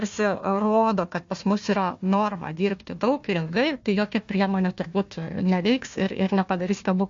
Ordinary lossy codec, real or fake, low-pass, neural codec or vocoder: MP3, 48 kbps; fake; 7.2 kHz; codec, 16 kHz, 1 kbps, FunCodec, trained on Chinese and English, 50 frames a second